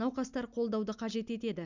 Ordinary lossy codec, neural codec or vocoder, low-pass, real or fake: none; none; 7.2 kHz; real